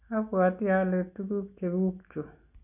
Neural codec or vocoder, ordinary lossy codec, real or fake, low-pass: none; none; real; 3.6 kHz